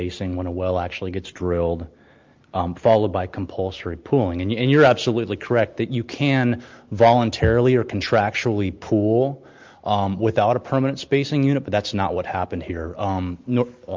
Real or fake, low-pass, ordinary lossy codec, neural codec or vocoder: real; 7.2 kHz; Opus, 32 kbps; none